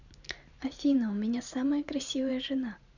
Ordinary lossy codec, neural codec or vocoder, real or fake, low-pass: none; vocoder, 44.1 kHz, 128 mel bands every 512 samples, BigVGAN v2; fake; 7.2 kHz